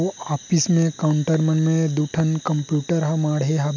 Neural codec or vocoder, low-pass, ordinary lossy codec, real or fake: none; 7.2 kHz; none; real